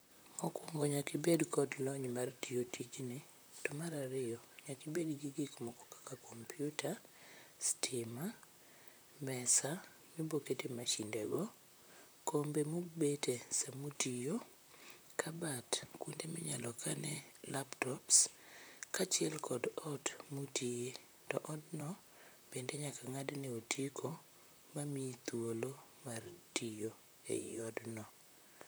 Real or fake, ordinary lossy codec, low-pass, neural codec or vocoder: fake; none; none; vocoder, 44.1 kHz, 128 mel bands, Pupu-Vocoder